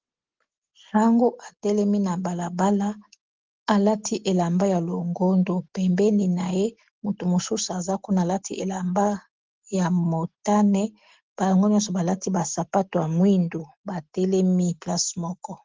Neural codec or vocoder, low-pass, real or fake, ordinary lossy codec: none; 7.2 kHz; real; Opus, 16 kbps